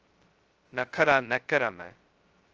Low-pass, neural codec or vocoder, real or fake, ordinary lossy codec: 7.2 kHz; codec, 16 kHz, 0.2 kbps, FocalCodec; fake; Opus, 24 kbps